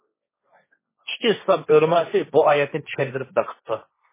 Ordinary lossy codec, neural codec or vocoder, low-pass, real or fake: MP3, 16 kbps; codec, 16 kHz, 1.1 kbps, Voila-Tokenizer; 3.6 kHz; fake